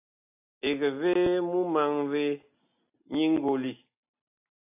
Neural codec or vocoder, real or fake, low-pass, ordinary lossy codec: none; real; 3.6 kHz; AAC, 32 kbps